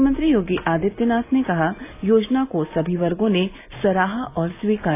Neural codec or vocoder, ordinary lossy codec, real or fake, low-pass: none; AAC, 24 kbps; real; 3.6 kHz